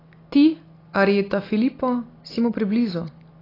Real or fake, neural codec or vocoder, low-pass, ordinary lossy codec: real; none; 5.4 kHz; AAC, 24 kbps